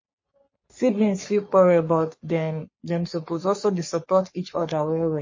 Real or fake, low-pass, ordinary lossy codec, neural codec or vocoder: fake; 7.2 kHz; MP3, 32 kbps; codec, 44.1 kHz, 3.4 kbps, Pupu-Codec